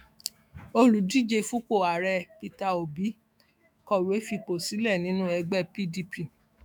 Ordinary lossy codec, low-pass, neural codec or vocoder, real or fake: none; none; autoencoder, 48 kHz, 128 numbers a frame, DAC-VAE, trained on Japanese speech; fake